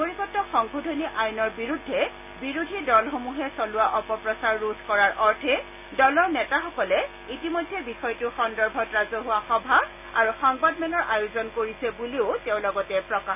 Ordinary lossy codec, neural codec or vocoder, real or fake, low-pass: none; none; real; 3.6 kHz